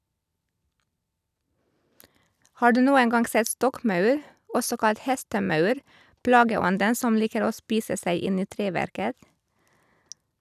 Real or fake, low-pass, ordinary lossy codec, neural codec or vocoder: real; 14.4 kHz; none; none